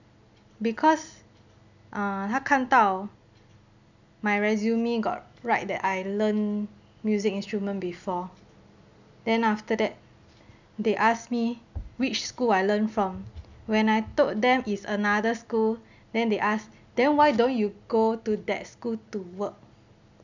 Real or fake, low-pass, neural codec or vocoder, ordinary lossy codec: real; 7.2 kHz; none; none